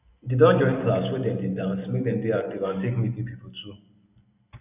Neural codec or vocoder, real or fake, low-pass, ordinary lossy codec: none; real; 3.6 kHz; none